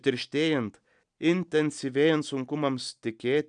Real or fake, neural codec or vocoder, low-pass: real; none; 9.9 kHz